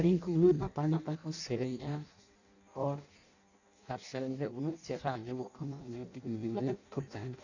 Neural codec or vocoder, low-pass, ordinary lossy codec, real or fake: codec, 16 kHz in and 24 kHz out, 0.6 kbps, FireRedTTS-2 codec; 7.2 kHz; none; fake